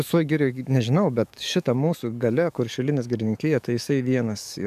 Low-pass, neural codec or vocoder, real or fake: 14.4 kHz; codec, 44.1 kHz, 7.8 kbps, DAC; fake